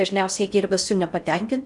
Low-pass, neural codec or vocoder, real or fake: 10.8 kHz; codec, 16 kHz in and 24 kHz out, 0.6 kbps, FocalCodec, streaming, 4096 codes; fake